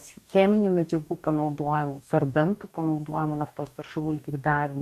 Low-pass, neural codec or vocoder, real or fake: 14.4 kHz; codec, 44.1 kHz, 2.6 kbps, DAC; fake